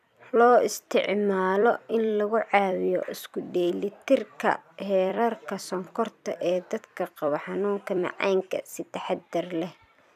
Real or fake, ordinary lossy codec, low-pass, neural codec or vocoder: fake; none; 14.4 kHz; vocoder, 44.1 kHz, 128 mel bands every 256 samples, BigVGAN v2